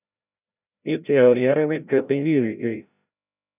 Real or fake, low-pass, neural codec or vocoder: fake; 3.6 kHz; codec, 16 kHz, 0.5 kbps, FreqCodec, larger model